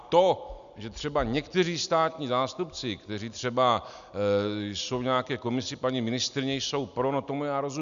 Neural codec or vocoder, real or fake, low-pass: none; real; 7.2 kHz